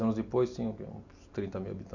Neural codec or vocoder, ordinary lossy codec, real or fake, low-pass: none; AAC, 48 kbps; real; 7.2 kHz